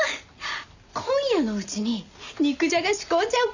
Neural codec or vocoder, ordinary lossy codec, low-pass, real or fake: none; none; 7.2 kHz; real